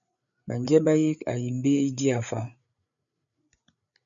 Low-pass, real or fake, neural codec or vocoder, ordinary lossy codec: 7.2 kHz; fake; codec, 16 kHz, 8 kbps, FreqCodec, larger model; MP3, 64 kbps